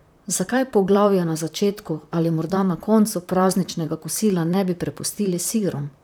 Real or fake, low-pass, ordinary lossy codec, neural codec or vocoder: fake; none; none; vocoder, 44.1 kHz, 128 mel bands, Pupu-Vocoder